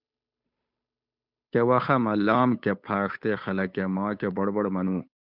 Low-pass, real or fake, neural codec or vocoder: 5.4 kHz; fake; codec, 16 kHz, 8 kbps, FunCodec, trained on Chinese and English, 25 frames a second